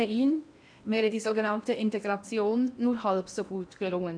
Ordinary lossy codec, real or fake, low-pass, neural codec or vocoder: none; fake; 9.9 kHz; codec, 16 kHz in and 24 kHz out, 0.8 kbps, FocalCodec, streaming, 65536 codes